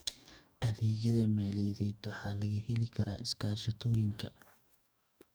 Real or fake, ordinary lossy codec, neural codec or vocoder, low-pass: fake; none; codec, 44.1 kHz, 2.6 kbps, DAC; none